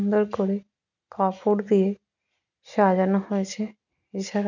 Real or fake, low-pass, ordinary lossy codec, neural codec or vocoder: real; 7.2 kHz; none; none